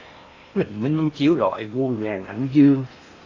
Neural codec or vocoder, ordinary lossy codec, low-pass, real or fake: codec, 16 kHz in and 24 kHz out, 0.8 kbps, FocalCodec, streaming, 65536 codes; AAC, 32 kbps; 7.2 kHz; fake